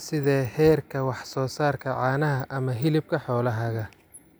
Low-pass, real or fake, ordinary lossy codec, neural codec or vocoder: none; real; none; none